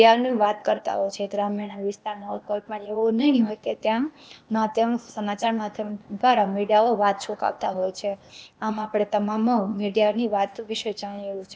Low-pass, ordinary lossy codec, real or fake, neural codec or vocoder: none; none; fake; codec, 16 kHz, 0.8 kbps, ZipCodec